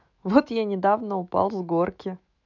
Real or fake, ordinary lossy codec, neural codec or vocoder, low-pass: fake; none; autoencoder, 48 kHz, 128 numbers a frame, DAC-VAE, trained on Japanese speech; 7.2 kHz